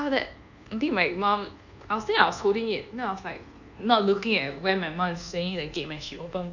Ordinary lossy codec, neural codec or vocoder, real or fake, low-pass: none; codec, 24 kHz, 1.2 kbps, DualCodec; fake; 7.2 kHz